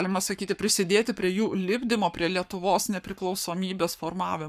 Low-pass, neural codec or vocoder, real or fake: 14.4 kHz; codec, 44.1 kHz, 7.8 kbps, Pupu-Codec; fake